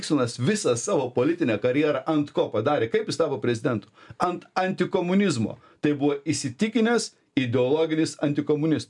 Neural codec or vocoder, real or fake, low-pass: none; real; 10.8 kHz